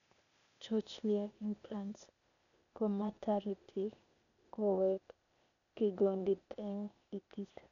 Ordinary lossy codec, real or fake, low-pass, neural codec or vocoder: none; fake; 7.2 kHz; codec, 16 kHz, 0.8 kbps, ZipCodec